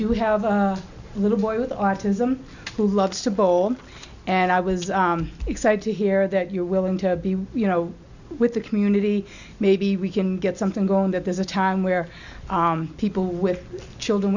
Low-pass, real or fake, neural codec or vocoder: 7.2 kHz; real; none